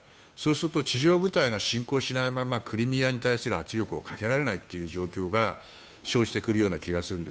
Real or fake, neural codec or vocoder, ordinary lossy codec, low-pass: fake; codec, 16 kHz, 2 kbps, FunCodec, trained on Chinese and English, 25 frames a second; none; none